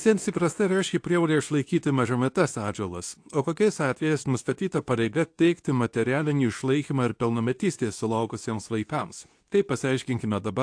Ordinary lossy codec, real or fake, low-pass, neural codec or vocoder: AAC, 64 kbps; fake; 9.9 kHz; codec, 24 kHz, 0.9 kbps, WavTokenizer, medium speech release version 2